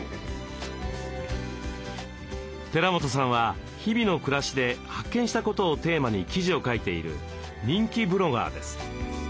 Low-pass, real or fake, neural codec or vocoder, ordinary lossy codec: none; real; none; none